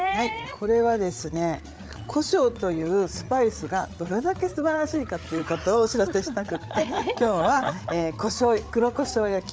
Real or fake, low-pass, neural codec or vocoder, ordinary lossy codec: fake; none; codec, 16 kHz, 8 kbps, FreqCodec, larger model; none